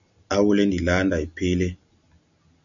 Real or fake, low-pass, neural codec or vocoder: real; 7.2 kHz; none